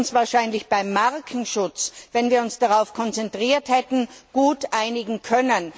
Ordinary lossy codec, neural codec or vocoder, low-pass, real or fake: none; none; none; real